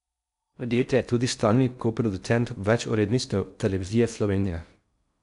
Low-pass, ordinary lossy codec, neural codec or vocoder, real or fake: 10.8 kHz; none; codec, 16 kHz in and 24 kHz out, 0.6 kbps, FocalCodec, streaming, 4096 codes; fake